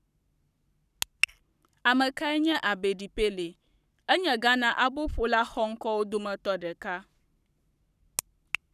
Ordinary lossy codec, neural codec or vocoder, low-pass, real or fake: none; codec, 44.1 kHz, 7.8 kbps, Pupu-Codec; 14.4 kHz; fake